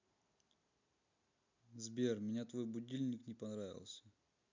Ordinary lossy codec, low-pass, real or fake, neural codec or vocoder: MP3, 64 kbps; 7.2 kHz; real; none